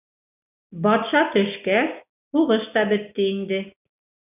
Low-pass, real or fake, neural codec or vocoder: 3.6 kHz; real; none